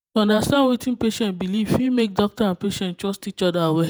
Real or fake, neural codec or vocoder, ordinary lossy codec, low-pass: fake; vocoder, 48 kHz, 128 mel bands, Vocos; none; none